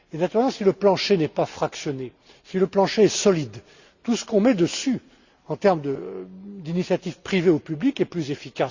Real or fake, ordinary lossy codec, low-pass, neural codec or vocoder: real; Opus, 64 kbps; 7.2 kHz; none